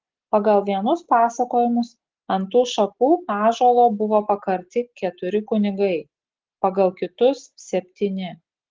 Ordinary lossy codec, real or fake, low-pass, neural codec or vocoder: Opus, 16 kbps; real; 7.2 kHz; none